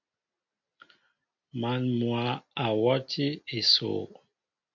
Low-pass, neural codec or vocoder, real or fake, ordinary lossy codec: 7.2 kHz; none; real; MP3, 48 kbps